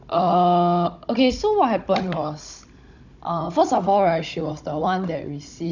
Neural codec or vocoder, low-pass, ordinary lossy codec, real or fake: codec, 16 kHz, 16 kbps, FunCodec, trained on Chinese and English, 50 frames a second; 7.2 kHz; none; fake